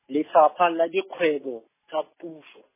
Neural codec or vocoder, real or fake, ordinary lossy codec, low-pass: none; real; MP3, 16 kbps; 3.6 kHz